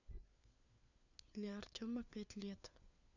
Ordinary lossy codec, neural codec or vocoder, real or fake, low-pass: none; codec, 16 kHz, 4 kbps, FunCodec, trained on LibriTTS, 50 frames a second; fake; 7.2 kHz